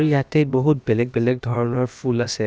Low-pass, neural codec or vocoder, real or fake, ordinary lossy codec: none; codec, 16 kHz, about 1 kbps, DyCAST, with the encoder's durations; fake; none